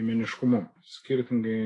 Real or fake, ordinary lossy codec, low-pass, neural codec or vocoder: fake; AAC, 32 kbps; 10.8 kHz; autoencoder, 48 kHz, 128 numbers a frame, DAC-VAE, trained on Japanese speech